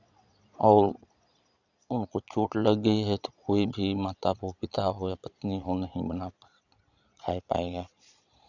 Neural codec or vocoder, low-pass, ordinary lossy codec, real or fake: vocoder, 22.05 kHz, 80 mel bands, Vocos; 7.2 kHz; none; fake